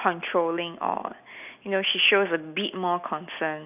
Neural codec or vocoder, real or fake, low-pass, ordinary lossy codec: none; real; 3.6 kHz; none